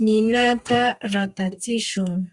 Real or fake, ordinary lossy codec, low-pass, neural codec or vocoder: fake; Opus, 64 kbps; 10.8 kHz; codec, 32 kHz, 1.9 kbps, SNAC